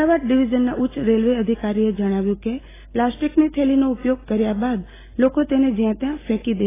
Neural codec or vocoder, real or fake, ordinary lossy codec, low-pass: none; real; AAC, 16 kbps; 3.6 kHz